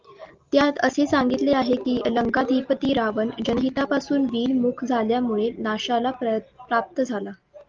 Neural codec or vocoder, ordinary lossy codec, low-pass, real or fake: none; Opus, 32 kbps; 7.2 kHz; real